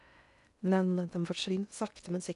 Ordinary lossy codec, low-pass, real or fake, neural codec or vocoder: none; 10.8 kHz; fake; codec, 16 kHz in and 24 kHz out, 0.6 kbps, FocalCodec, streaming, 2048 codes